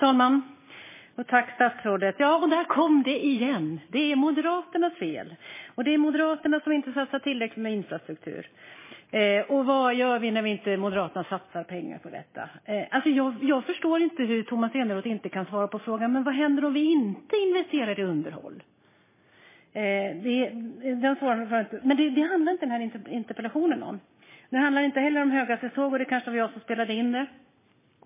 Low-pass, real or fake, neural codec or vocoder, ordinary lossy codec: 3.6 kHz; real; none; MP3, 16 kbps